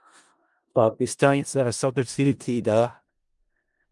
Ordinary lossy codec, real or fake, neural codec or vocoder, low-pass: Opus, 32 kbps; fake; codec, 16 kHz in and 24 kHz out, 0.4 kbps, LongCat-Audio-Codec, four codebook decoder; 10.8 kHz